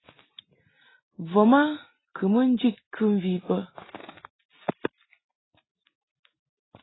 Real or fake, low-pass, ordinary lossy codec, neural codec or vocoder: real; 7.2 kHz; AAC, 16 kbps; none